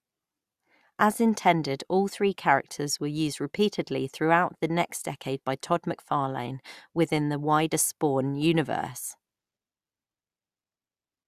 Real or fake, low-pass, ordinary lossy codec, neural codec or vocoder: real; 14.4 kHz; Opus, 64 kbps; none